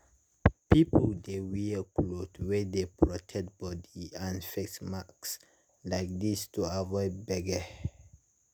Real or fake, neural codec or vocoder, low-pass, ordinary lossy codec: real; none; none; none